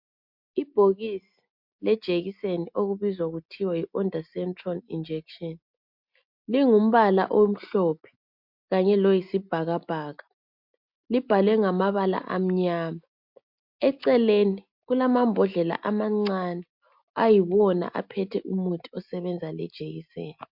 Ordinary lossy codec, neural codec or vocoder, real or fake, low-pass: MP3, 48 kbps; none; real; 5.4 kHz